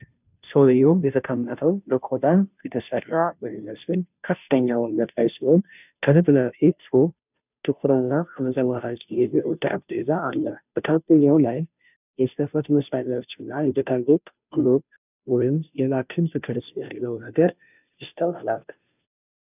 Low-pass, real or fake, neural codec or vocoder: 3.6 kHz; fake; codec, 16 kHz, 0.5 kbps, FunCodec, trained on Chinese and English, 25 frames a second